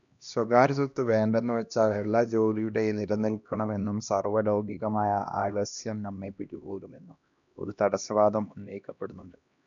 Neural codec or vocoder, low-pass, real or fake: codec, 16 kHz, 1 kbps, X-Codec, HuBERT features, trained on LibriSpeech; 7.2 kHz; fake